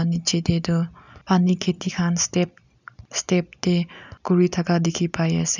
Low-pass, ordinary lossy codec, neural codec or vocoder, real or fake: 7.2 kHz; none; none; real